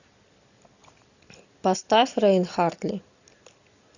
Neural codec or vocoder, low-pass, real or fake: none; 7.2 kHz; real